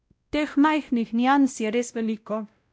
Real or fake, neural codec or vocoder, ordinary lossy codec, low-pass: fake; codec, 16 kHz, 0.5 kbps, X-Codec, WavLM features, trained on Multilingual LibriSpeech; none; none